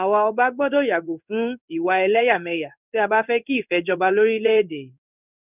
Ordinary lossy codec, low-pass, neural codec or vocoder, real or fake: none; 3.6 kHz; codec, 16 kHz in and 24 kHz out, 1 kbps, XY-Tokenizer; fake